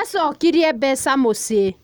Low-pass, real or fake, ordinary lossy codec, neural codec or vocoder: none; real; none; none